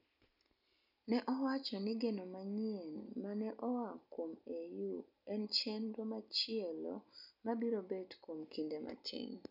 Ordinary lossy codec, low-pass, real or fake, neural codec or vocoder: none; 5.4 kHz; real; none